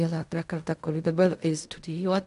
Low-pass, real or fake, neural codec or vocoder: 10.8 kHz; fake; codec, 16 kHz in and 24 kHz out, 0.4 kbps, LongCat-Audio-Codec, fine tuned four codebook decoder